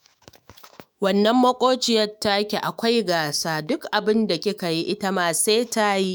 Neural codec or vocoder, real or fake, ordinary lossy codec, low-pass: autoencoder, 48 kHz, 128 numbers a frame, DAC-VAE, trained on Japanese speech; fake; none; none